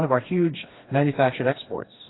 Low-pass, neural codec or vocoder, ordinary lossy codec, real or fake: 7.2 kHz; codec, 16 kHz, 2 kbps, FreqCodec, smaller model; AAC, 16 kbps; fake